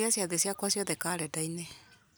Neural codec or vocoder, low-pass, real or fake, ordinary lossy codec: none; none; real; none